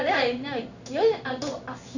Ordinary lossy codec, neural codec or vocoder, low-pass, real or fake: none; codec, 16 kHz in and 24 kHz out, 1 kbps, XY-Tokenizer; 7.2 kHz; fake